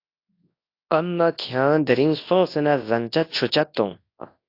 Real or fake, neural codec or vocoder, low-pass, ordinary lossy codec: fake; codec, 24 kHz, 0.9 kbps, WavTokenizer, large speech release; 5.4 kHz; AAC, 32 kbps